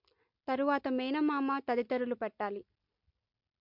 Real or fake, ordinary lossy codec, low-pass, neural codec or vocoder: real; MP3, 48 kbps; 5.4 kHz; none